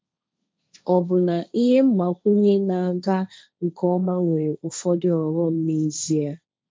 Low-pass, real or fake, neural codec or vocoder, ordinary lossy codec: none; fake; codec, 16 kHz, 1.1 kbps, Voila-Tokenizer; none